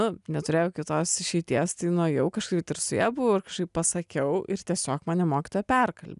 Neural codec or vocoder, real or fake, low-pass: none; real; 10.8 kHz